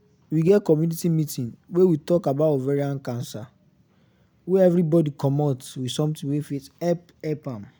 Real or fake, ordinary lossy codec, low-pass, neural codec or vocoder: real; none; none; none